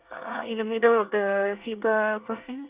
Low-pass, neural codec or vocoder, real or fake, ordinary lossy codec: 3.6 kHz; codec, 24 kHz, 1 kbps, SNAC; fake; Opus, 64 kbps